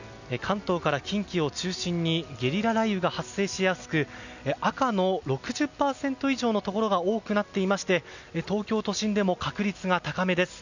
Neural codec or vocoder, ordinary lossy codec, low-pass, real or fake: none; none; 7.2 kHz; real